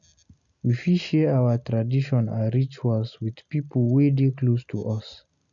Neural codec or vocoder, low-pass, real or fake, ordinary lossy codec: none; 7.2 kHz; real; none